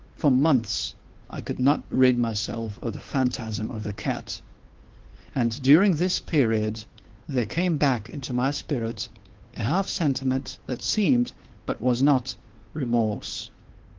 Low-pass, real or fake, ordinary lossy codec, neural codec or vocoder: 7.2 kHz; fake; Opus, 16 kbps; codec, 16 kHz, 2 kbps, FunCodec, trained on Chinese and English, 25 frames a second